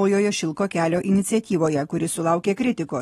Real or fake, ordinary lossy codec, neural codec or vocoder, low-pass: real; AAC, 32 kbps; none; 19.8 kHz